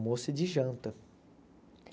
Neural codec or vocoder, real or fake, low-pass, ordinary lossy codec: none; real; none; none